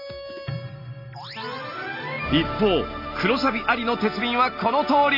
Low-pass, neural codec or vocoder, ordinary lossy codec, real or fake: 5.4 kHz; none; none; real